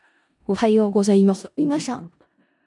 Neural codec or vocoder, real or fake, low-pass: codec, 16 kHz in and 24 kHz out, 0.4 kbps, LongCat-Audio-Codec, four codebook decoder; fake; 10.8 kHz